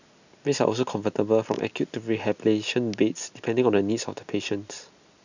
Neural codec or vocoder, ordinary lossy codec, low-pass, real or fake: none; none; 7.2 kHz; real